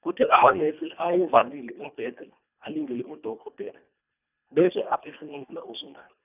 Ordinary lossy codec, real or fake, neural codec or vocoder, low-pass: none; fake; codec, 24 kHz, 1.5 kbps, HILCodec; 3.6 kHz